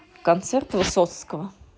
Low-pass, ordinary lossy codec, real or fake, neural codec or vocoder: none; none; real; none